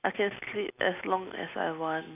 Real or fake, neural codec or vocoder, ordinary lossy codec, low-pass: real; none; none; 3.6 kHz